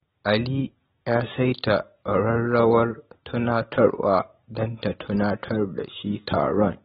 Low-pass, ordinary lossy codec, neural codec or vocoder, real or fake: 7.2 kHz; AAC, 16 kbps; none; real